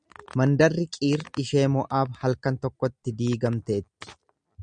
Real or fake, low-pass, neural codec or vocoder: real; 9.9 kHz; none